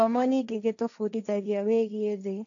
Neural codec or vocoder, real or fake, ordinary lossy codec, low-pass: codec, 16 kHz, 1.1 kbps, Voila-Tokenizer; fake; none; 7.2 kHz